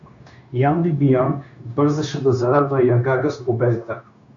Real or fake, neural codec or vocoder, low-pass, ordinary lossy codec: fake; codec, 16 kHz, 0.9 kbps, LongCat-Audio-Codec; 7.2 kHz; MP3, 48 kbps